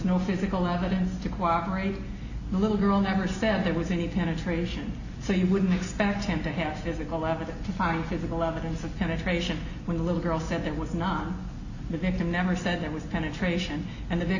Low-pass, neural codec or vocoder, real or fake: 7.2 kHz; none; real